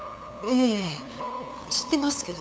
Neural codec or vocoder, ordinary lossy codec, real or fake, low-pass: codec, 16 kHz, 8 kbps, FunCodec, trained on LibriTTS, 25 frames a second; none; fake; none